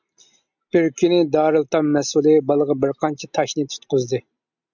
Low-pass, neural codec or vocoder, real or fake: 7.2 kHz; none; real